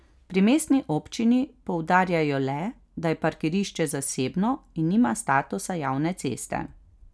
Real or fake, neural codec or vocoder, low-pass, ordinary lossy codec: real; none; none; none